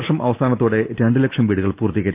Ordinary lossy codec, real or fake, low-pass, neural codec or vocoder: Opus, 32 kbps; real; 3.6 kHz; none